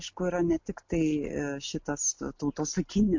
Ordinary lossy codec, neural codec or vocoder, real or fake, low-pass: MP3, 48 kbps; none; real; 7.2 kHz